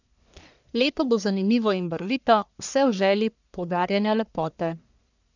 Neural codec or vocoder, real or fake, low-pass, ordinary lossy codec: codec, 44.1 kHz, 1.7 kbps, Pupu-Codec; fake; 7.2 kHz; none